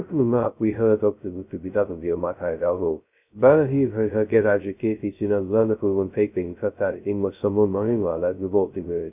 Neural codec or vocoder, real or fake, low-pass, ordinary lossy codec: codec, 16 kHz, 0.2 kbps, FocalCodec; fake; 3.6 kHz; none